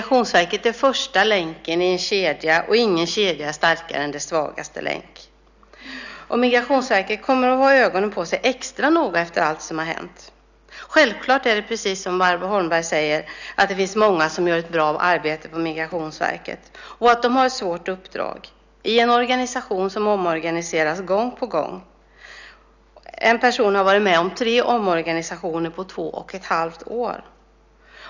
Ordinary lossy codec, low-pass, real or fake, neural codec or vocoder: none; 7.2 kHz; real; none